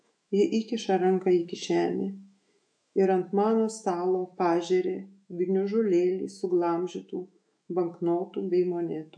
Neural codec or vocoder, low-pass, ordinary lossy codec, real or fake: autoencoder, 48 kHz, 128 numbers a frame, DAC-VAE, trained on Japanese speech; 9.9 kHz; AAC, 64 kbps; fake